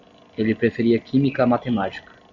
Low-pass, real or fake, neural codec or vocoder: 7.2 kHz; real; none